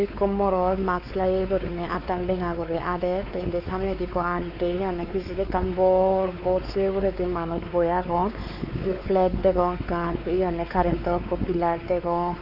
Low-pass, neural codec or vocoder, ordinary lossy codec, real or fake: 5.4 kHz; codec, 16 kHz, 4 kbps, X-Codec, WavLM features, trained on Multilingual LibriSpeech; AAC, 32 kbps; fake